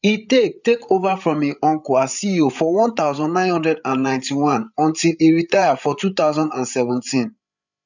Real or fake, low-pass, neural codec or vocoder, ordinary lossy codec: fake; 7.2 kHz; codec, 16 kHz, 8 kbps, FreqCodec, larger model; none